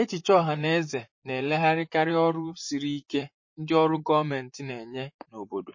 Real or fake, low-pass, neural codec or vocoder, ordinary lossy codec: fake; 7.2 kHz; vocoder, 44.1 kHz, 128 mel bands, Pupu-Vocoder; MP3, 32 kbps